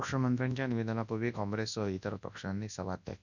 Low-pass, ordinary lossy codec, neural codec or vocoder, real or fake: 7.2 kHz; none; codec, 24 kHz, 0.9 kbps, WavTokenizer, large speech release; fake